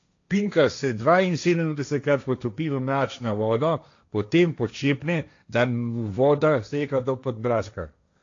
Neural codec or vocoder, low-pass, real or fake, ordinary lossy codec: codec, 16 kHz, 1.1 kbps, Voila-Tokenizer; 7.2 kHz; fake; AAC, 48 kbps